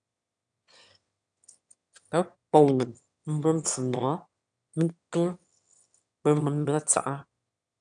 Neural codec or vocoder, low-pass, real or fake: autoencoder, 22.05 kHz, a latent of 192 numbers a frame, VITS, trained on one speaker; 9.9 kHz; fake